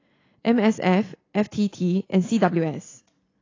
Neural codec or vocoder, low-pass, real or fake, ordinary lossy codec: none; 7.2 kHz; real; AAC, 32 kbps